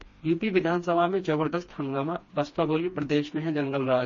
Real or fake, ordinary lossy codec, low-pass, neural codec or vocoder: fake; MP3, 32 kbps; 7.2 kHz; codec, 16 kHz, 2 kbps, FreqCodec, smaller model